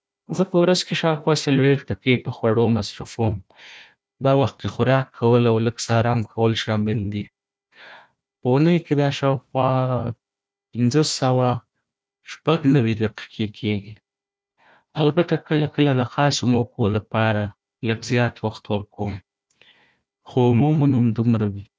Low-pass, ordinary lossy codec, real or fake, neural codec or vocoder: none; none; fake; codec, 16 kHz, 1 kbps, FunCodec, trained on Chinese and English, 50 frames a second